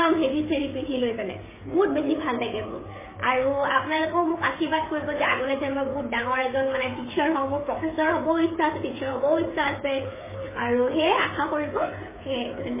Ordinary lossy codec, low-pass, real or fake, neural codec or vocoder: MP3, 16 kbps; 3.6 kHz; fake; codec, 16 kHz, 8 kbps, FreqCodec, smaller model